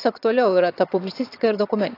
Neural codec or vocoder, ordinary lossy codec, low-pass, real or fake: vocoder, 22.05 kHz, 80 mel bands, HiFi-GAN; AAC, 32 kbps; 5.4 kHz; fake